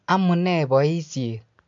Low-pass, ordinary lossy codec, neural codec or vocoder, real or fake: 7.2 kHz; AAC, 64 kbps; none; real